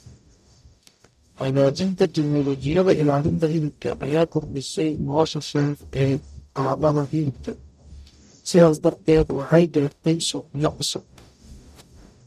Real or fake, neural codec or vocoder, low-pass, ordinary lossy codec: fake; codec, 44.1 kHz, 0.9 kbps, DAC; 14.4 kHz; none